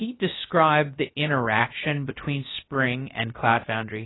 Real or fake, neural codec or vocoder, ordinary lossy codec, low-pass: fake; codec, 16 kHz, 0.3 kbps, FocalCodec; AAC, 16 kbps; 7.2 kHz